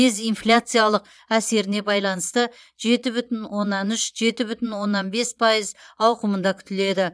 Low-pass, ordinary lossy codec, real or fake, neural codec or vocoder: none; none; fake; vocoder, 22.05 kHz, 80 mel bands, Vocos